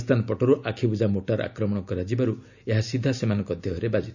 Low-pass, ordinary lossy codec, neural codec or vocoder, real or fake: 7.2 kHz; none; none; real